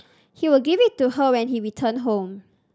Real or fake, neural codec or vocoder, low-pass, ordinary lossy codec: real; none; none; none